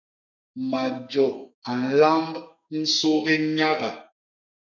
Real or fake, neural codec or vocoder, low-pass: fake; codec, 32 kHz, 1.9 kbps, SNAC; 7.2 kHz